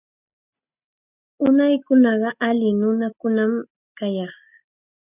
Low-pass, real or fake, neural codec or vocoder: 3.6 kHz; real; none